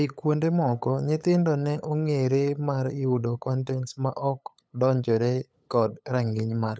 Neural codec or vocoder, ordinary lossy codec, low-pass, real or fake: codec, 16 kHz, 8 kbps, FunCodec, trained on LibriTTS, 25 frames a second; none; none; fake